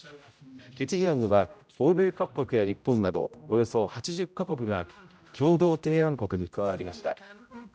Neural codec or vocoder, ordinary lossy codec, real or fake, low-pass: codec, 16 kHz, 0.5 kbps, X-Codec, HuBERT features, trained on general audio; none; fake; none